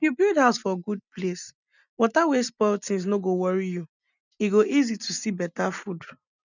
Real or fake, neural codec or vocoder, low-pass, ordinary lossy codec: real; none; 7.2 kHz; none